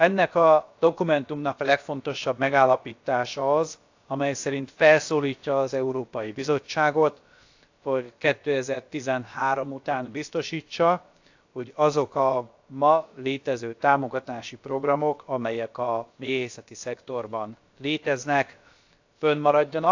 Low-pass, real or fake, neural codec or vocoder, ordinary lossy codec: 7.2 kHz; fake; codec, 16 kHz, about 1 kbps, DyCAST, with the encoder's durations; AAC, 48 kbps